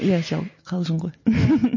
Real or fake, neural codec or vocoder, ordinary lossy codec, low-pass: real; none; MP3, 32 kbps; 7.2 kHz